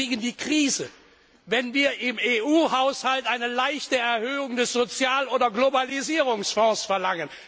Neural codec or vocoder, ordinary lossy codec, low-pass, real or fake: none; none; none; real